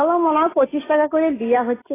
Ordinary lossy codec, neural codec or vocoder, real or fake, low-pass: AAC, 16 kbps; none; real; 3.6 kHz